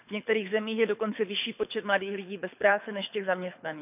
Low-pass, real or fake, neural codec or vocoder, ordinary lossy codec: 3.6 kHz; fake; codec, 24 kHz, 6 kbps, HILCodec; none